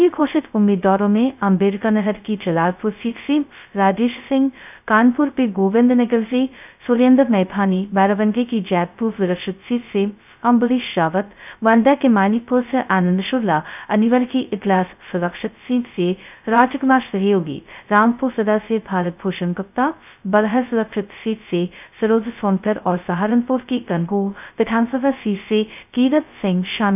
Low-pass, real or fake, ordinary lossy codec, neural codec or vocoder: 3.6 kHz; fake; none; codec, 16 kHz, 0.2 kbps, FocalCodec